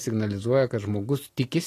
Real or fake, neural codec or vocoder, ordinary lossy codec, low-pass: real; none; AAC, 48 kbps; 14.4 kHz